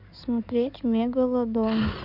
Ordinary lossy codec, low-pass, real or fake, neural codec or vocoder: none; 5.4 kHz; fake; codec, 16 kHz in and 24 kHz out, 2.2 kbps, FireRedTTS-2 codec